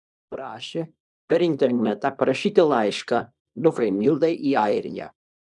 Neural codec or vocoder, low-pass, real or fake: codec, 24 kHz, 0.9 kbps, WavTokenizer, small release; 10.8 kHz; fake